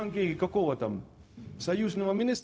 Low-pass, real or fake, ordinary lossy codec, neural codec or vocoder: none; fake; none; codec, 16 kHz, 0.4 kbps, LongCat-Audio-Codec